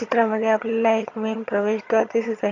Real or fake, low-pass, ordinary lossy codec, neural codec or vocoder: fake; 7.2 kHz; AAC, 48 kbps; vocoder, 22.05 kHz, 80 mel bands, HiFi-GAN